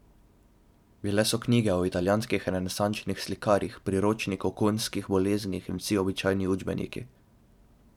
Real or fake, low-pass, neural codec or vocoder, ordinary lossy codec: fake; 19.8 kHz; vocoder, 44.1 kHz, 128 mel bands every 512 samples, BigVGAN v2; none